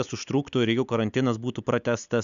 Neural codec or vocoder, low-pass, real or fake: none; 7.2 kHz; real